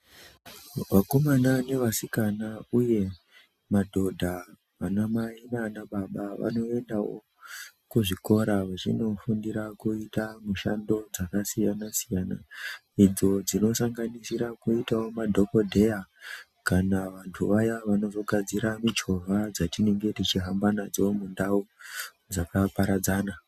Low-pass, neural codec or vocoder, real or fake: 14.4 kHz; none; real